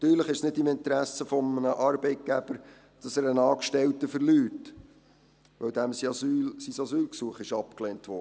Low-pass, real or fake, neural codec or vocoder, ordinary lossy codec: none; real; none; none